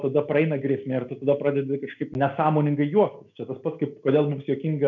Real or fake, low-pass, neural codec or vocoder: real; 7.2 kHz; none